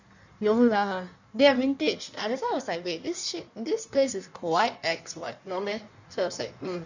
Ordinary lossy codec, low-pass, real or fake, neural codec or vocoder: none; 7.2 kHz; fake; codec, 16 kHz in and 24 kHz out, 1.1 kbps, FireRedTTS-2 codec